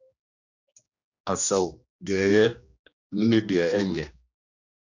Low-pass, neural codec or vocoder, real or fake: 7.2 kHz; codec, 16 kHz, 1 kbps, X-Codec, HuBERT features, trained on general audio; fake